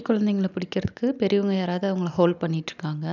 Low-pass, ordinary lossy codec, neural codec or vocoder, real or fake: 7.2 kHz; none; none; real